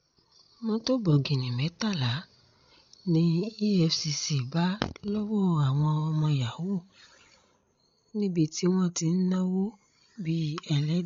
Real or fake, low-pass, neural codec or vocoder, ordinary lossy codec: fake; 7.2 kHz; codec, 16 kHz, 16 kbps, FreqCodec, larger model; MP3, 48 kbps